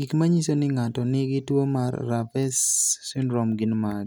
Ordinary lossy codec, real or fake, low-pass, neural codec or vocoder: none; real; none; none